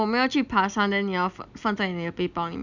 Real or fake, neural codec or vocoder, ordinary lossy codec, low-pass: real; none; none; 7.2 kHz